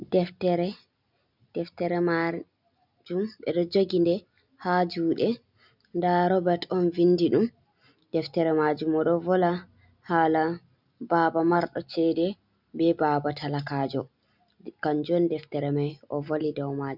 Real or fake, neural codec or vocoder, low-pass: real; none; 5.4 kHz